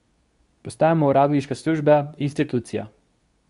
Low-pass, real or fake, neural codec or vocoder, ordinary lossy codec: 10.8 kHz; fake; codec, 24 kHz, 0.9 kbps, WavTokenizer, medium speech release version 2; none